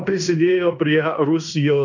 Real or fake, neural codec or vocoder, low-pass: fake; codec, 16 kHz in and 24 kHz out, 0.9 kbps, LongCat-Audio-Codec, fine tuned four codebook decoder; 7.2 kHz